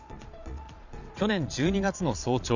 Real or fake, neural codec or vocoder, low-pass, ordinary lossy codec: fake; vocoder, 22.05 kHz, 80 mel bands, Vocos; 7.2 kHz; none